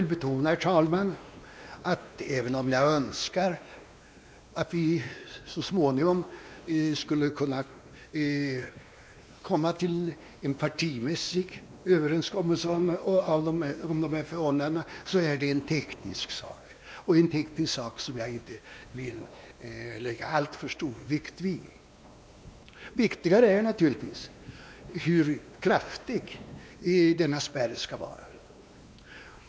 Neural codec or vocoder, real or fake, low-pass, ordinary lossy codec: codec, 16 kHz, 2 kbps, X-Codec, WavLM features, trained on Multilingual LibriSpeech; fake; none; none